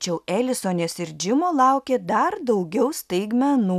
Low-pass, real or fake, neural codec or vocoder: 14.4 kHz; real; none